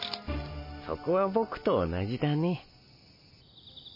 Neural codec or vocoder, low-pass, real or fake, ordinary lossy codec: none; 5.4 kHz; real; MP3, 24 kbps